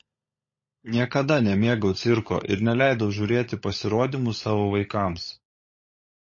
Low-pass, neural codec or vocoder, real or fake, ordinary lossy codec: 7.2 kHz; codec, 16 kHz, 16 kbps, FunCodec, trained on LibriTTS, 50 frames a second; fake; MP3, 32 kbps